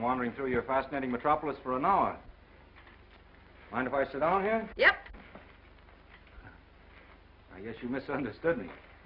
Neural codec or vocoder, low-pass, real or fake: none; 5.4 kHz; real